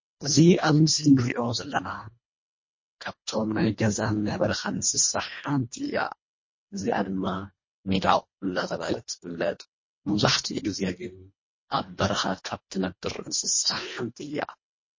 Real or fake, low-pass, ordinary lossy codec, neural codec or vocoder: fake; 7.2 kHz; MP3, 32 kbps; codec, 24 kHz, 1.5 kbps, HILCodec